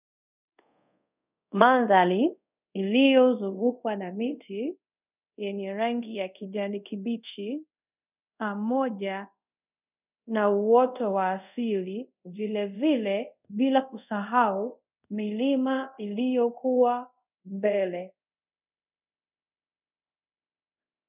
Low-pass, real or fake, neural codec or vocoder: 3.6 kHz; fake; codec, 24 kHz, 0.5 kbps, DualCodec